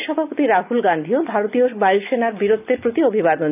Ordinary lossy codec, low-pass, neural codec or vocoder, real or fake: AAC, 32 kbps; 3.6 kHz; none; real